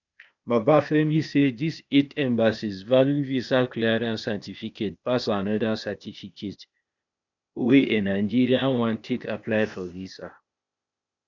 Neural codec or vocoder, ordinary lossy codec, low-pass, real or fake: codec, 16 kHz, 0.8 kbps, ZipCodec; none; 7.2 kHz; fake